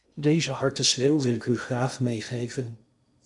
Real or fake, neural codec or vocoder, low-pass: fake; codec, 16 kHz in and 24 kHz out, 0.6 kbps, FocalCodec, streaming, 2048 codes; 10.8 kHz